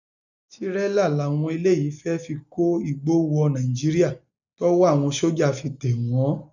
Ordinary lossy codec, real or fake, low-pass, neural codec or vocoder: none; real; 7.2 kHz; none